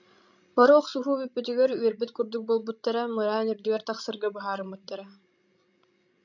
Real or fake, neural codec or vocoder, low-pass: fake; codec, 16 kHz, 16 kbps, FreqCodec, larger model; 7.2 kHz